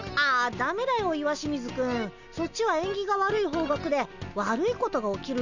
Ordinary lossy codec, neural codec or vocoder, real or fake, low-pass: none; none; real; 7.2 kHz